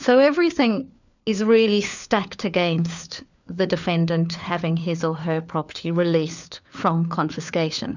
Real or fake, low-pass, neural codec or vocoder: fake; 7.2 kHz; codec, 16 kHz, 4 kbps, FunCodec, trained on LibriTTS, 50 frames a second